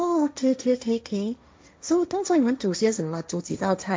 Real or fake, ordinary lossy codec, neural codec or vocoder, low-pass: fake; none; codec, 16 kHz, 1.1 kbps, Voila-Tokenizer; none